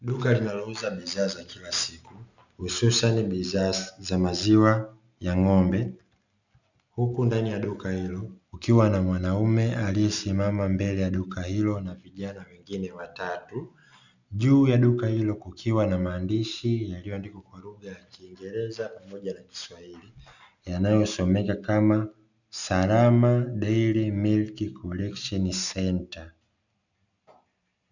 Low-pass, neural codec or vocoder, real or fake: 7.2 kHz; none; real